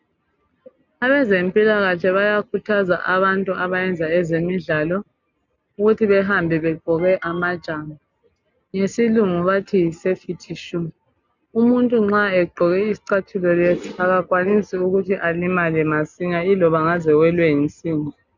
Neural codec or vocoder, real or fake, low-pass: none; real; 7.2 kHz